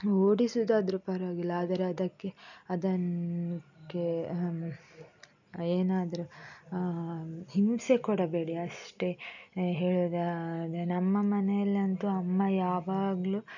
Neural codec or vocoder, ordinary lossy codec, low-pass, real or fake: none; none; 7.2 kHz; real